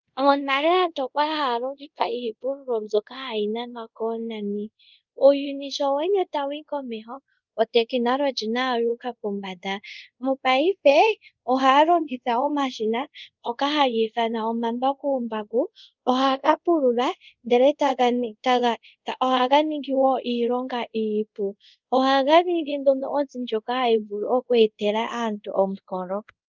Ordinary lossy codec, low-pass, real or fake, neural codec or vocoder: Opus, 24 kbps; 7.2 kHz; fake; codec, 24 kHz, 0.5 kbps, DualCodec